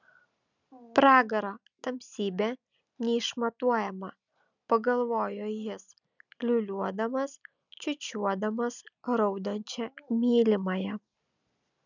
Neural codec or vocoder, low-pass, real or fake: none; 7.2 kHz; real